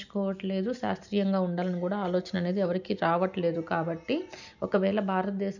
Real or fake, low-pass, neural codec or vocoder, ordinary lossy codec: real; 7.2 kHz; none; none